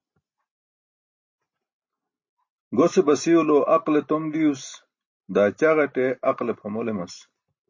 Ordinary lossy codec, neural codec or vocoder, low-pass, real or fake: MP3, 32 kbps; none; 7.2 kHz; real